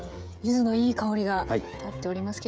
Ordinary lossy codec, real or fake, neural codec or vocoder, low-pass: none; fake; codec, 16 kHz, 16 kbps, FreqCodec, smaller model; none